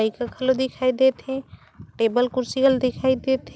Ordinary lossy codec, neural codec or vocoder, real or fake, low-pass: none; none; real; none